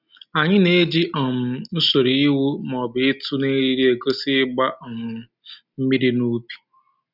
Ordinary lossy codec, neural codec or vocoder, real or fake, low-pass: none; none; real; 5.4 kHz